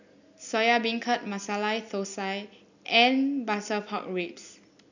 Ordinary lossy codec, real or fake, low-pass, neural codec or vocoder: none; real; 7.2 kHz; none